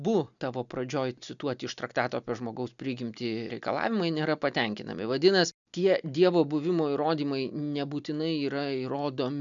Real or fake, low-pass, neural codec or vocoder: real; 7.2 kHz; none